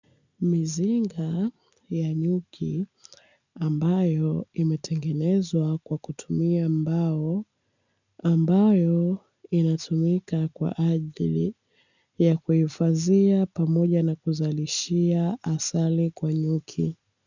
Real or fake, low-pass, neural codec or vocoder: real; 7.2 kHz; none